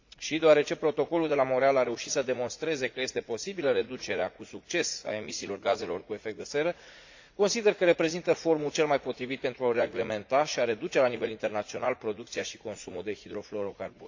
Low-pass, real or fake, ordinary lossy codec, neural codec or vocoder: 7.2 kHz; fake; AAC, 48 kbps; vocoder, 44.1 kHz, 80 mel bands, Vocos